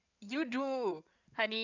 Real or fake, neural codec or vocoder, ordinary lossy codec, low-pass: fake; codec, 16 kHz in and 24 kHz out, 2.2 kbps, FireRedTTS-2 codec; none; 7.2 kHz